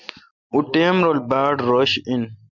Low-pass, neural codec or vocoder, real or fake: 7.2 kHz; none; real